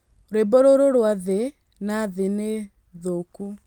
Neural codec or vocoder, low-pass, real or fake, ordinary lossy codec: none; 19.8 kHz; real; Opus, 32 kbps